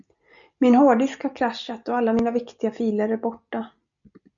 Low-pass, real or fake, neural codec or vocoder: 7.2 kHz; real; none